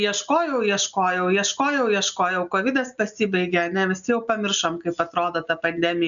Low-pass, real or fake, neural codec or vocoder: 7.2 kHz; real; none